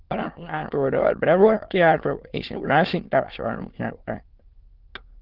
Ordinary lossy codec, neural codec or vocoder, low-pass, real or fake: Opus, 16 kbps; autoencoder, 22.05 kHz, a latent of 192 numbers a frame, VITS, trained on many speakers; 5.4 kHz; fake